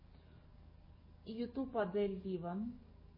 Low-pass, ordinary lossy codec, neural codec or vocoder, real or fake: 5.4 kHz; MP3, 24 kbps; codec, 44.1 kHz, 7.8 kbps, DAC; fake